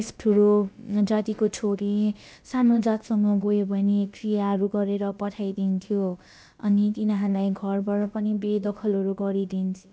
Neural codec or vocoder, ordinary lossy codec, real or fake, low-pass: codec, 16 kHz, about 1 kbps, DyCAST, with the encoder's durations; none; fake; none